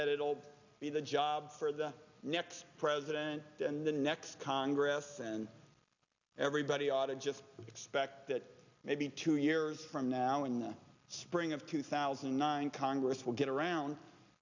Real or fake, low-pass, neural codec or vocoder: real; 7.2 kHz; none